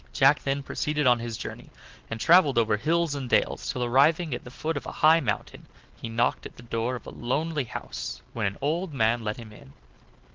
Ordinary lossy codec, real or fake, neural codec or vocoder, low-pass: Opus, 16 kbps; real; none; 7.2 kHz